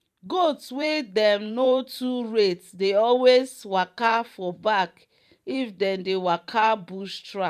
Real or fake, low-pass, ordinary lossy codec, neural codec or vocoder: fake; 14.4 kHz; none; vocoder, 44.1 kHz, 128 mel bands every 512 samples, BigVGAN v2